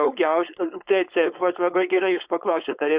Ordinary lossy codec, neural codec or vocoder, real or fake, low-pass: Opus, 64 kbps; codec, 16 kHz, 4.8 kbps, FACodec; fake; 3.6 kHz